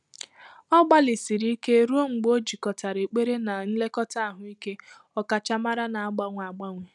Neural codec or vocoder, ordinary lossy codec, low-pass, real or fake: none; none; 10.8 kHz; real